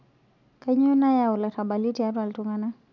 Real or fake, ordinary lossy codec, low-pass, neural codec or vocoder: real; none; 7.2 kHz; none